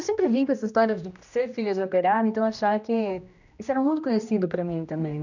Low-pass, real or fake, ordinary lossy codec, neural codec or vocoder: 7.2 kHz; fake; none; codec, 16 kHz, 1 kbps, X-Codec, HuBERT features, trained on general audio